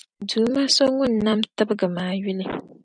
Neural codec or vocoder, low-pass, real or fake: vocoder, 44.1 kHz, 128 mel bands every 256 samples, BigVGAN v2; 9.9 kHz; fake